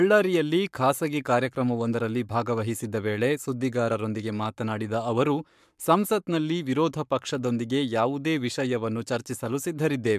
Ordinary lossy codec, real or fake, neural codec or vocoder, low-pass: MP3, 96 kbps; fake; codec, 44.1 kHz, 7.8 kbps, Pupu-Codec; 14.4 kHz